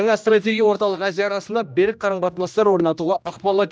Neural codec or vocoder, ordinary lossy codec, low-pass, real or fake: codec, 16 kHz, 1 kbps, X-Codec, HuBERT features, trained on general audio; none; none; fake